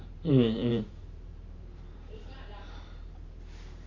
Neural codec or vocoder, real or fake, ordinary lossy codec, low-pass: vocoder, 44.1 kHz, 128 mel bands every 512 samples, BigVGAN v2; fake; none; 7.2 kHz